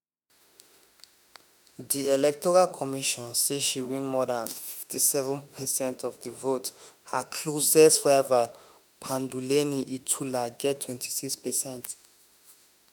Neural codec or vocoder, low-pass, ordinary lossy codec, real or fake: autoencoder, 48 kHz, 32 numbers a frame, DAC-VAE, trained on Japanese speech; none; none; fake